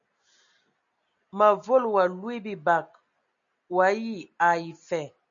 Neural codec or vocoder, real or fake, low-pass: none; real; 7.2 kHz